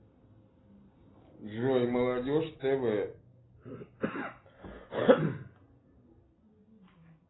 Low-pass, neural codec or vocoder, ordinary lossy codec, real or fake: 7.2 kHz; none; AAC, 16 kbps; real